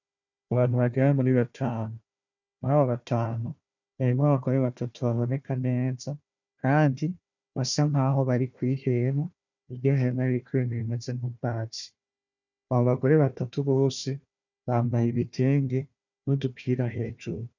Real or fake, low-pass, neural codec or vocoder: fake; 7.2 kHz; codec, 16 kHz, 1 kbps, FunCodec, trained on Chinese and English, 50 frames a second